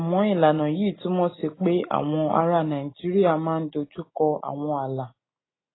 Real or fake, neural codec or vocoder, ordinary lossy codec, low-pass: real; none; AAC, 16 kbps; 7.2 kHz